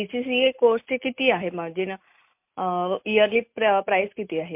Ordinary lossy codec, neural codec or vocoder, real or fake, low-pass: MP3, 32 kbps; none; real; 3.6 kHz